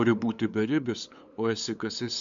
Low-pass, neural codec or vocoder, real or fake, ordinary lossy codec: 7.2 kHz; codec, 16 kHz, 8 kbps, FunCodec, trained on LibriTTS, 25 frames a second; fake; MP3, 64 kbps